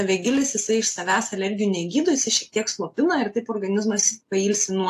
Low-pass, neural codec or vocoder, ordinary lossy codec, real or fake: 14.4 kHz; none; AAC, 64 kbps; real